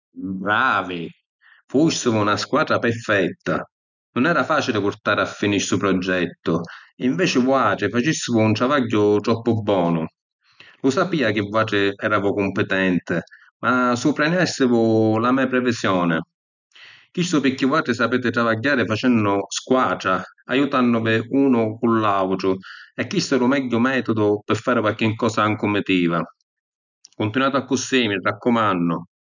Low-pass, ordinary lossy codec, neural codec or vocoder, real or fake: 7.2 kHz; none; none; real